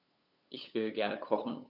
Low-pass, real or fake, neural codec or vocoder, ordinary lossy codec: 5.4 kHz; fake; codec, 16 kHz, 8 kbps, FunCodec, trained on LibriTTS, 25 frames a second; none